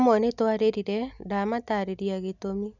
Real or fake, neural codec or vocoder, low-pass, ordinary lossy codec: real; none; 7.2 kHz; none